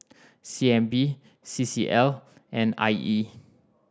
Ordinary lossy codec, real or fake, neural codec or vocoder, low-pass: none; real; none; none